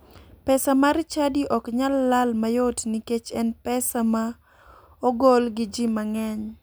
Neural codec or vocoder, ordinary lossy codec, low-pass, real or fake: none; none; none; real